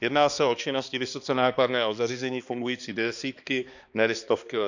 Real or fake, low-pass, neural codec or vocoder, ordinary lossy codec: fake; 7.2 kHz; codec, 16 kHz, 2 kbps, X-Codec, HuBERT features, trained on balanced general audio; none